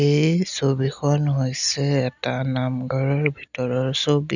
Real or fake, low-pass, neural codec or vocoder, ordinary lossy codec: real; 7.2 kHz; none; none